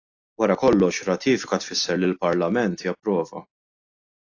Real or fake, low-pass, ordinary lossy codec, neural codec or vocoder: real; 7.2 kHz; AAC, 48 kbps; none